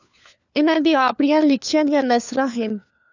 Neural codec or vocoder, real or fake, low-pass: codec, 16 kHz, 4 kbps, FunCodec, trained on LibriTTS, 50 frames a second; fake; 7.2 kHz